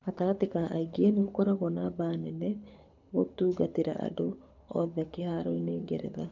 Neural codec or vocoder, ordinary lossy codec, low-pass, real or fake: codec, 24 kHz, 6 kbps, HILCodec; none; 7.2 kHz; fake